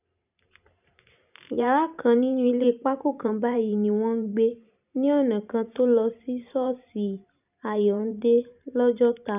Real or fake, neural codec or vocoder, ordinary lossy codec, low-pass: fake; vocoder, 44.1 kHz, 128 mel bands every 512 samples, BigVGAN v2; none; 3.6 kHz